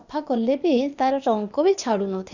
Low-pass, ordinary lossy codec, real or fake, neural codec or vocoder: 7.2 kHz; none; fake; codec, 16 kHz, 0.8 kbps, ZipCodec